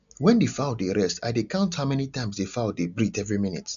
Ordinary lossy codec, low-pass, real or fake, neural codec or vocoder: none; 7.2 kHz; real; none